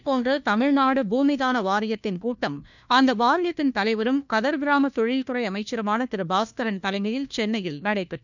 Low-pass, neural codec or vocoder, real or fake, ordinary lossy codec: 7.2 kHz; codec, 16 kHz, 1 kbps, FunCodec, trained on LibriTTS, 50 frames a second; fake; none